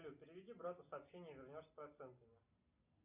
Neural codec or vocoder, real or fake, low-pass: none; real; 3.6 kHz